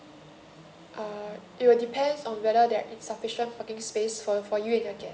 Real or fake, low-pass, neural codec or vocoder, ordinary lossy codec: real; none; none; none